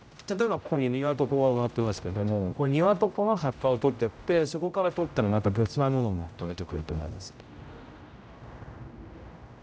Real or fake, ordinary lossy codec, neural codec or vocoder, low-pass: fake; none; codec, 16 kHz, 0.5 kbps, X-Codec, HuBERT features, trained on general audio; none